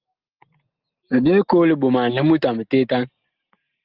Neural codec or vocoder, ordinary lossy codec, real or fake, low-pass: none; Opus, 32 kbps; real; 5.4 kHz